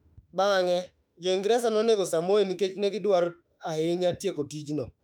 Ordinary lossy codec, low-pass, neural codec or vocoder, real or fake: none; 19.8 kHz; autoencoder, 48 kHz, 32 numbers a frame, DAC-VAE, trained on Japanese speech; fake